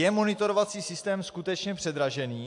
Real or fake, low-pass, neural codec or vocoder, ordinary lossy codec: real; 10.8 kHz; none; AAC, 64 kbps